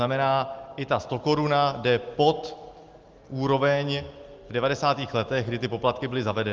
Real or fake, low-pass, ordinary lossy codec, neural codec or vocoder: real; 7.2 kHz; Opus, 24 kbps; none